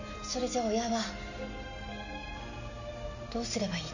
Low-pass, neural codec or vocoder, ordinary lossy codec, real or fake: 7.2 kHz; none; none; real